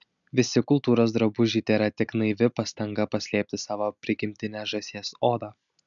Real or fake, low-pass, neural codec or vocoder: real; 7.2 kHz; none